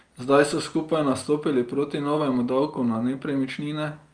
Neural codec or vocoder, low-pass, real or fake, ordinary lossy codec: none; 9.9 kHz; real; Opus, 32 kbps